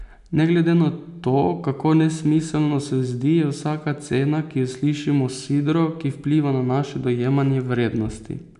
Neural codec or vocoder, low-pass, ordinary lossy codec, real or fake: none; 10.8 kHz; none; real